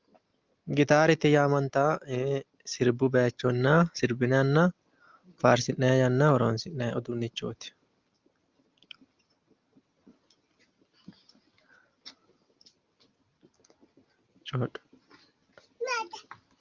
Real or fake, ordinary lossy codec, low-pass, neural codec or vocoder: real; Opus, 24 kbps; 7.2 kHz; none